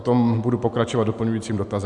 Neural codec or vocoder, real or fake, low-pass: none; real; 10.8 kHz